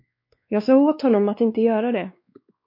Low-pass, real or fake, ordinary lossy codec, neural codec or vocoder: 5.4 kHz; fake; MP3, 32 kbps; codec, 16 kHz, 2 kbps, X-Codec, WavLM features, trained on Multilingual LibriSpeech